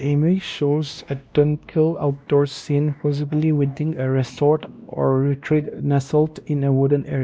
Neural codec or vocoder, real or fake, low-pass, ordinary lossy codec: codec, 16 kHz, 1 kbps, X-Codec, WavLM features, trained on Multilingual LibriSpeech; fake; none; none